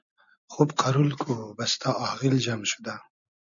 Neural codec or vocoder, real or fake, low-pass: none; real; 7.2 kHz